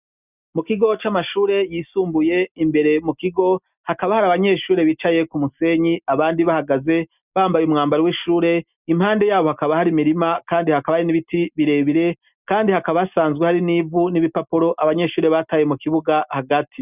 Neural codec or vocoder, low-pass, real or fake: none; 3.6 kHz; real